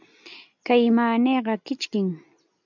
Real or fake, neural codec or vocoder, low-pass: real; none; 7.2 kHz